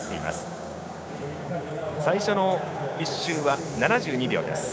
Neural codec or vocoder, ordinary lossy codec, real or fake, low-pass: codec, 16 kHz, 6 kbps, DAC; none; fake; none